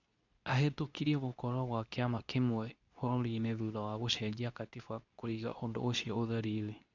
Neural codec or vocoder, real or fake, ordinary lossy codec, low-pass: codec, 24 kHz, 0.9 kbps, WavTokenizer, medium speech release version 2; fake; none; 7.2 kHz